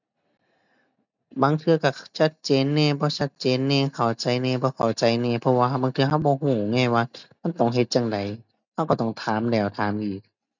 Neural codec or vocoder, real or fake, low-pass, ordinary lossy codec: none; real; 7.2 kHz; none